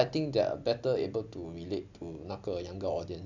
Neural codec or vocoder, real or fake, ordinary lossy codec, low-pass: none; real; none; 7.2 kHz